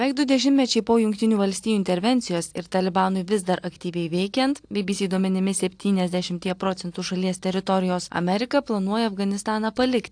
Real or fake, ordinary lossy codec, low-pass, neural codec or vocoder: real; AAC, 64 kbps; 9.9 kHz; none